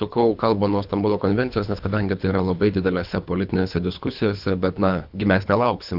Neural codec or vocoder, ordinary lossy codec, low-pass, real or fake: codec, 24 kHz, 3 kbps, HILCodec; AAC, 48 kbps; 5.4 kHz; fake